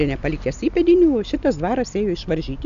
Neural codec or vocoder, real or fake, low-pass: none; real; 7.2 kHz